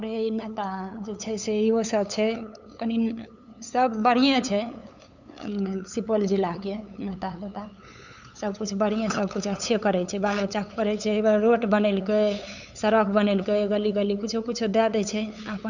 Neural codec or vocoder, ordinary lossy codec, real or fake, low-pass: codec, 16 kHz, 8 kbps, FunCodec, trained on LibriTTS, 25 frames a second; none; fake; 7.2 kHz